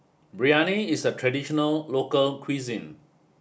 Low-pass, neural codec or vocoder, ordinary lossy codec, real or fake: none; none; none; real